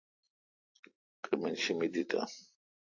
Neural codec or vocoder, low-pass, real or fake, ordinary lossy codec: none; 7.2 kHz; real; AAC, 48 kbps